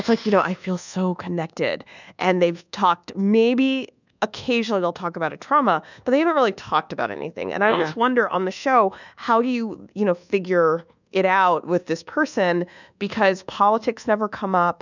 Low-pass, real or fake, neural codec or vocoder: 7.2 kHz; fake; codec, 24 kHz, 1.2 kbps, DualCodec